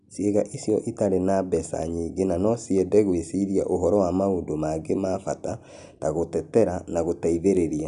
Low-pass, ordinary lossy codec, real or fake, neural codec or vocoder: 10.8 kHz; none; real; none